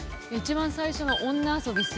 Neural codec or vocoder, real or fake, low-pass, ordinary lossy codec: none; real; none; none